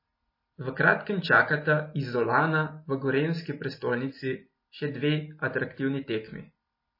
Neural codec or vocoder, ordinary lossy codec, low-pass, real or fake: none; MP3, 24 kbps; 5.4 kHz; real